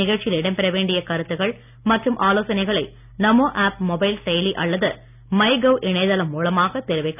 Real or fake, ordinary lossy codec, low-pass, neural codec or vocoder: real; none; 3.6 kHz; none